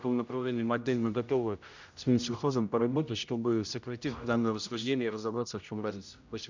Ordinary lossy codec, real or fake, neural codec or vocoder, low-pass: none; fake; codec, 16 kHz, 0.5 kbps, X-Codec, HuBERT features, trained on general audio; 7.2 kHz